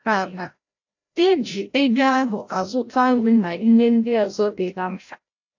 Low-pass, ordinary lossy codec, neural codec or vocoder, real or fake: 7.2 kHz; AAC, 48 kbps; codec, 16 kHz, 0.5 kbps, FreqCodec, larger model; fake